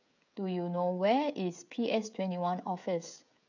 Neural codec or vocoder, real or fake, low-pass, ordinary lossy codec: codec, 16 kHz, 16 kbps, FreqCodec, smaller model; fake; 7.2 kHz; none